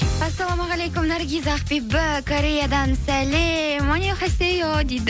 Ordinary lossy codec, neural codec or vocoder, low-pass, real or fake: none; none; none; real